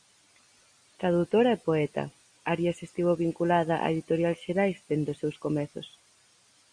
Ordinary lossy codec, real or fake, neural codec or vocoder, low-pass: Opus, 64 kbps; real; none; 9.9 kHz